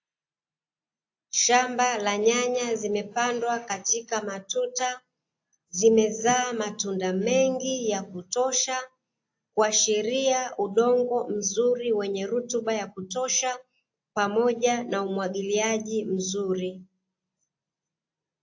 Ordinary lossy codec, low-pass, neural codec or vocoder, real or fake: AAC, 48 kbps; 7.2 kHz; none; real